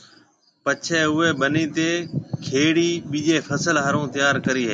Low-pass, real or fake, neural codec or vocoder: 9.9 kHz; real; none